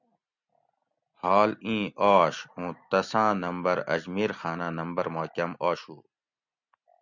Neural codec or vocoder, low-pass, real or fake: none; 7.2 kHz; real